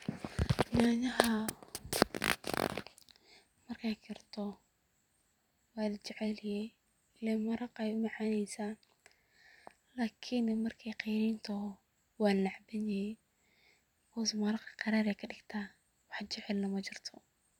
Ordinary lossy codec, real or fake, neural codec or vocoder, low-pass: none; fake; vocoder, 44.1 kHz, 128 mel bands every 256 samples, BigVGAN v2; 19.8 kHz